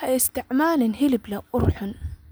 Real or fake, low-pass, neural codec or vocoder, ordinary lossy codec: real; none; none; none